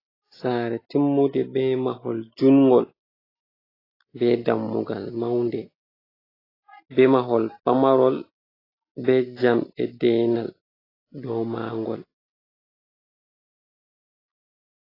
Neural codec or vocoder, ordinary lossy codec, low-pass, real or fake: none; AAC, 24 kbps; 5.4 kHz; real